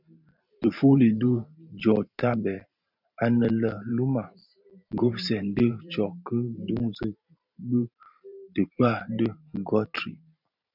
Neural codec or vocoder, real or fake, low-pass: vocoder, 44.1 kHz, 128 mel bands every 512 samples, BigVGAN v2; fake; 5.4 kHz